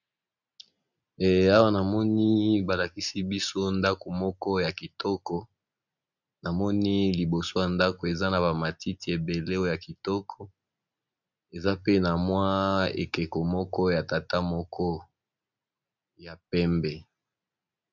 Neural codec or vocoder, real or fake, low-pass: none; real; 7.2 kHz